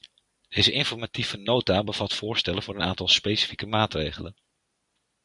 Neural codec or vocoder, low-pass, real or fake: none; 10.8 kHz; real